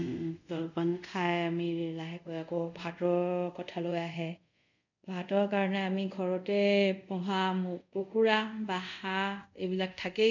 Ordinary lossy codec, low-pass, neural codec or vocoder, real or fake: none; 7.2 kHz; codec, 24 kHz, 0.5 kbps, DualCodec; fake